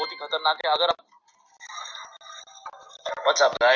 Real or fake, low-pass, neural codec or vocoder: real; 7.2 kHz; none